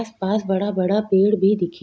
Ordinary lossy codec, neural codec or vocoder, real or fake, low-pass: none; none; real; none